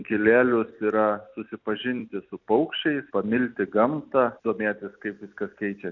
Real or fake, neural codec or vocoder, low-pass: real; none; 7.2 kHz